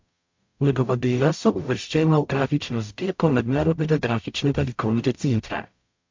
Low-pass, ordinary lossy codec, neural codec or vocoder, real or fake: 7.2 kHz; MP3, 48 kbps; codec, 44.1 kHz, 0.9 kbps, DAC; fake